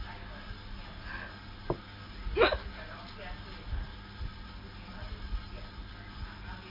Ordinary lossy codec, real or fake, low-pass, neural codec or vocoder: Opus, 64 kbps; real; 5.4 kHz; none